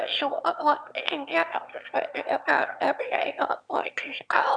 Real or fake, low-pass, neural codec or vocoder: fake; 9.9 kHz; autoencoder, 22.05 kHz, a latent of 192 numbers a frame, VITS, trained on one speaker